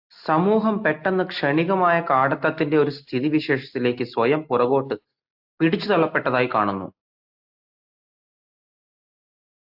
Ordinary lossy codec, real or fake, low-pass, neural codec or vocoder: AAC, 48 kbps; real; 5.4 kHz; none